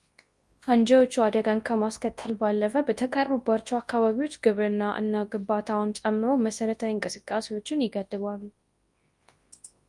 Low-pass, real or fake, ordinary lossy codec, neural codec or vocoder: 10.8 kHz; fake; Opus, 32 kbps; codec, 24 kHz, 0.9 kbps, WavTokenizer, large speech release